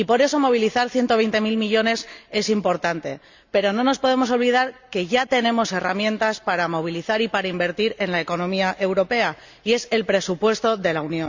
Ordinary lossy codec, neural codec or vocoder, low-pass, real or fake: Opus, 64 kbps; none; 7.2 kHz; real